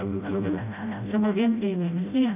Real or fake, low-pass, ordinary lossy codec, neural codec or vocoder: fake; 3.6 kHz; none; codec, 16 kHz, 0.5 kbps, FreqCodec, smaller model